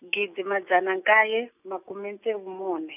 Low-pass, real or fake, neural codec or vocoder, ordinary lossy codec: 3.6 kHz; real; none; none